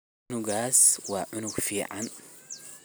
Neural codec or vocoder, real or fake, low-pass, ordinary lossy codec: none; real; none; none